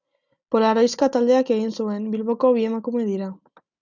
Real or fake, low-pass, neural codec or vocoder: real; 7.2 kHz; none